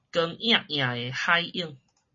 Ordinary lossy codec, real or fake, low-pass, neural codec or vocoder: MP3, 32 kbps; real; 7.2 kHz; none